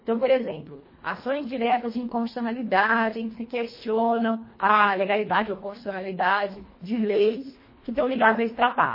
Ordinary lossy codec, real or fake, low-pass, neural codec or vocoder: MP3, 24 kbps; fake; 5.4 kHz; codec, 24 kHz, 1.5 kbps, HILCodec